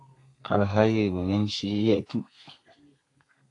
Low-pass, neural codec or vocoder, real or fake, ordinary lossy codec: 10.8 kHz; codec, 32 kHz, 1.9 kbps, SNAC; fake; AAC, 48 kbps